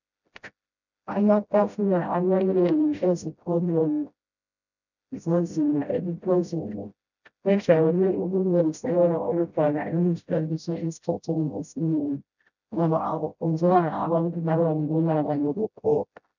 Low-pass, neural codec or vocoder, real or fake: 7.2 kHz; codec, 16 kHz, 0.5 kbps, FreqCodec, smaller model; fake